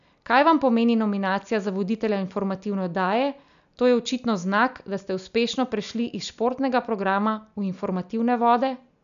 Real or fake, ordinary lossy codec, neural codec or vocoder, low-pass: real; none; none; 7.2 kHz